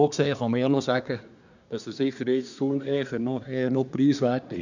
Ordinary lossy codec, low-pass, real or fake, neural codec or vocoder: none; 7.2 kHz; fake; codec, 24 kHz, 1 kbps, SNAC